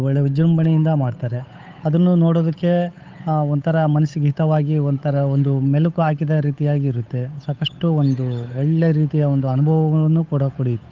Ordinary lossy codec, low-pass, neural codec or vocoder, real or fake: Opus, 24 kbps; 7.2 kHz; codec, 16 kHz, 8 kbps, FunCodec, trained on Chinese and English, 25 frames a second; fake